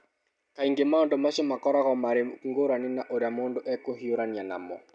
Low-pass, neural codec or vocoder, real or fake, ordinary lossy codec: 9.9 kHz; none; real; none